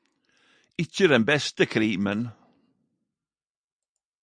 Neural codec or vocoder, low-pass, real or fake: none; 9.9 kHz; real